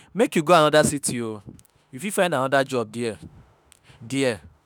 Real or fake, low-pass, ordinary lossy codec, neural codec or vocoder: fake; none; none; autoencoder, 48 kHz, 32 numbers a frame, DAC-VAE, trained on Japanese speech